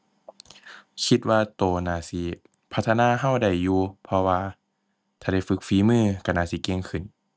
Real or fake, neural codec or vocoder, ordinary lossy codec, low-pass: real; none; none; none